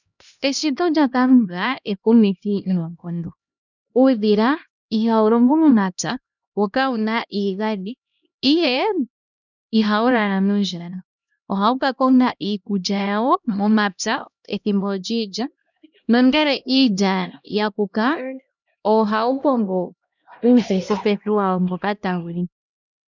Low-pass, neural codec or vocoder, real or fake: 7.2 kHz; codec, 16 kHz, 1 kbps, X-Codec, HuBERT features, trained on LibriSpeech; fake